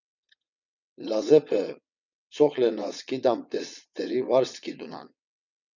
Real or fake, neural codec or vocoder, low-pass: fake; vocoder, 22.05 kHz, 80 mel bands, WaveNeXt; 7.2 kHz